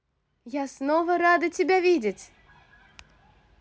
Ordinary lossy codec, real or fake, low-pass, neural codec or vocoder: none; real; none; none